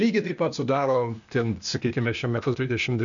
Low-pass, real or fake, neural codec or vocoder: 7.2 kHz; fake; codec, 16 kHz, 0.8 kbps, ZipCodec